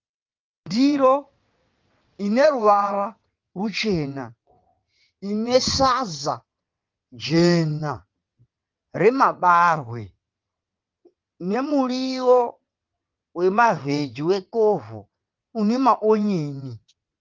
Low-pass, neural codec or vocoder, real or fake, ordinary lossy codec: 7.2 kHz; vocoder, 22.05 kHz, 80 mel bands, WaveNeXt; fake; Opus, 32 kbps